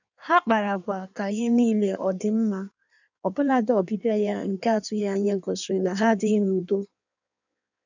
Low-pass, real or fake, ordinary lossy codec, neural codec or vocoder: 7.2 kHz; fake; none; codec, 16 kHz in and 24 kHz out, 1.1 kbps, FireRedTTS-2 codec